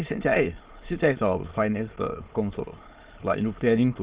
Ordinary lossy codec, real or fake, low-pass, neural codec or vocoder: Opus, 16 kbps; fake; 3.6 kHz; autoencoder, 22.05 kHz, a latent of 192 numbers a frame, VITS, trained on many speakers